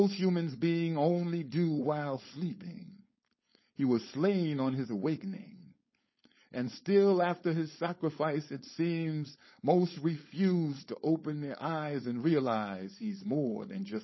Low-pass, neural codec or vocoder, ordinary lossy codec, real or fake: 7.2 kHz; codec, 16 kHz, 4.8 kbps, FACodec; MP3, 24 kbps; fake